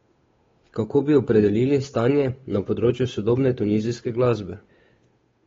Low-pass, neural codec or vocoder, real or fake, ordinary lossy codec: 7.2 kHz; codec, 16 kHz, 8 kbps, FunCodec, trained on Chinese and English, 25 frames a second; fake; AAC, 24 kbps